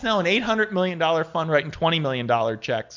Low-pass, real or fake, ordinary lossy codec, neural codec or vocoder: 7.2 kHz; real; MP3, 64 kbps; none